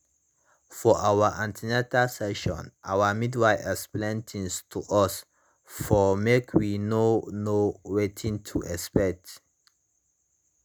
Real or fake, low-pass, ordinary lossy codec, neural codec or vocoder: real; none; none; none